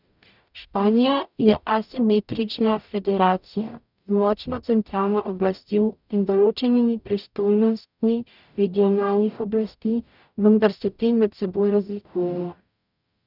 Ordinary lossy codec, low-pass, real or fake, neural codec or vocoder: none; 5.4 kHz; fake; codec, 44.1 kHz, 0.9 kbps, DAC